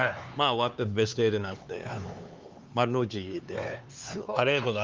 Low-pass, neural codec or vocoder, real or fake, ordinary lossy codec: 7.2 kHz; codec, 16 kHz, 4 kbps, X-Codec, HuBERT features, trained on LibriSpeech; fake; Opus, 24 kbps